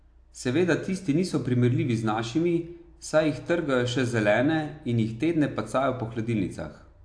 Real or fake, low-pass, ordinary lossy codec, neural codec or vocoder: real; 9.9 kHz; AAC, 64 kbps; none